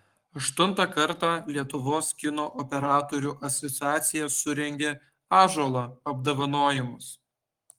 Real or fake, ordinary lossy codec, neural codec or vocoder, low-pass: fake; Opus, 24 kbps; codec, 44.1 kHz, 7.8 kbps, Pupu-Codec; 19.8 kHz